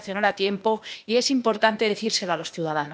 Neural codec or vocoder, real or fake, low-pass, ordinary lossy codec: codec, 16 kHz, 0.8 kbps, ZipCodec; fake; none; none